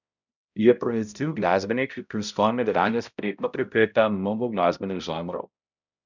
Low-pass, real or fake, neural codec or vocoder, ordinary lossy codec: 7.2 kHz; fake; codec, 16 kHz, 0.5 kbps, X-Codec, HuBERT features, trained on balanced general audio; none